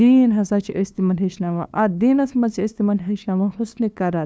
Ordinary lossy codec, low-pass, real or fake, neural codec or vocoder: none; none; fake; codec, 16 kHz, 2 kbps, FunCodec, trained on LibriTTS, 25 frames a second